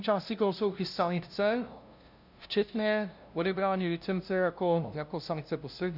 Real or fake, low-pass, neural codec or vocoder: fake; 5.4 kHz; codec, 16 kHz, 0.5 kbps, FunCodec, trained on LibriTTS, 25 frames a second